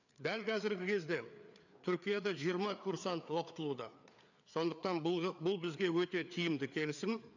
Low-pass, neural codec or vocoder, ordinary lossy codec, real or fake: 7.2 kHz; codec, 16 kHz, 4 kbps, FreqCodec, larger model; none; fake